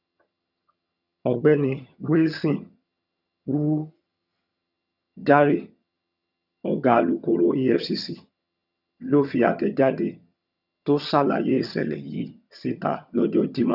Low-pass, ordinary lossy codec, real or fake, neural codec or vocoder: 5.4 kHz; none; fake; vocoder, 22.05 kHz, 80 mel bands, HiFi-GAN